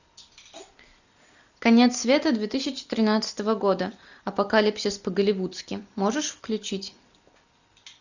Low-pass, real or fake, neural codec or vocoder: 7.2 kHz; real; none